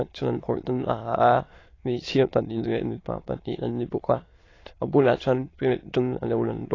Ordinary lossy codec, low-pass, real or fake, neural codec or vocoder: AAC, 32 kbps; 7.2 kHz; fake; autoencoder, 22.05 kHz, a latent of 192 numbers a frame, VITS, trained on many speakers